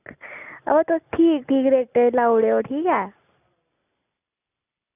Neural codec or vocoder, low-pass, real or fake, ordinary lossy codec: none; 3.6 kHz; real; none